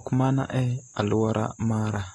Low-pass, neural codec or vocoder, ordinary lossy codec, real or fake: 19.8 kHz; none; AAC, 32 kbps; real